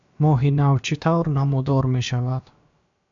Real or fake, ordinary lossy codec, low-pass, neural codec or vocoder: fake; AAC, 64 kbps; 7.2 kHz; codec, 16 kHz, about 1 kbps, DyCAST, with the encoder's durations